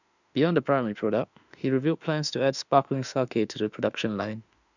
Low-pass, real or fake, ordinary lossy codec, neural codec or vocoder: 7.2 kHz; fake; none; autoencoder, 48 kHz, 32 numbers a frame, DAC-VAE, trained on Japanese speech